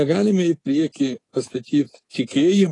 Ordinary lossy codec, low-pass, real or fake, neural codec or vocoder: AAC, 32 kbps; 10.8 kHz; fake; codec, 24 kHz, 3.1 kbps, DualCodec